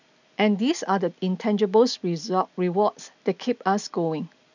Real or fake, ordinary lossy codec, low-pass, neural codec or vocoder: real; none; 7.2 kHz; none